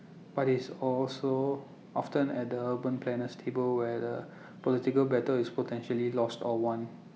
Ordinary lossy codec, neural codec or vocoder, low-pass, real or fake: none; none; none; real